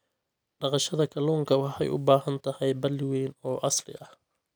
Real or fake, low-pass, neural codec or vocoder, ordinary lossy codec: real; none; none; none